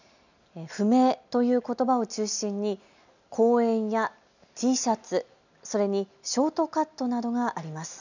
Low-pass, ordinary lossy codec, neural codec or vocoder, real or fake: 7.2 kHz; none; none; real